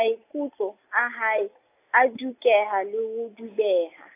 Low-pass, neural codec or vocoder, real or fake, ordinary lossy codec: 3.6 kHz; none; real; AAC, 24 kbps